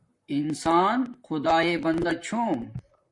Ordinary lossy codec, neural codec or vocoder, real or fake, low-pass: MP3, 64 kbps; vocoder, 44.1 kHz, 128 mel bands, Pupu-Vocoder; fake; 10.8 kHz